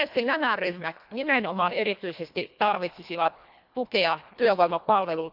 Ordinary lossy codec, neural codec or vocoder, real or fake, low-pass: none; codec, 24 kHz, 1.5 kbps, HILCodec; fake; 5.4 kHz